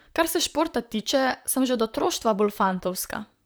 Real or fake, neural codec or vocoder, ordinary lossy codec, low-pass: fake; vocoder, 44.1 kHz, 128 mel bands, Pupu-Vocoder; none; none